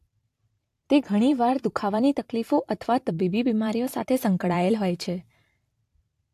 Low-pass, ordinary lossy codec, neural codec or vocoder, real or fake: 14.4 kHz; AAC, 64 kbps; vocoder, 44.1 kHz, 128 mel bands every 512 samples, BigVGAN v2; fake